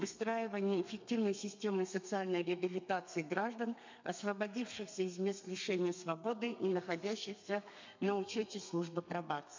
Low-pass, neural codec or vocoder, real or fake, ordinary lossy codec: 7.2 kHz; codec, 32 kHz, 1.9 kbps, SNAC; fake; MP3, 64 kbps